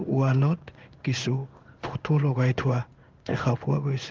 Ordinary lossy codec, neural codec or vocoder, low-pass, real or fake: Opus, 32 kbps; codec, 16 kHz in and 24 kHz out, 1 kbps, XY-Tokenizer; 7.2 kHz; fake